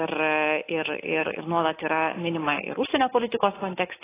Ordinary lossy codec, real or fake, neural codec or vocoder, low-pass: AAC, 16 kbps; real; none; 3.6 kHz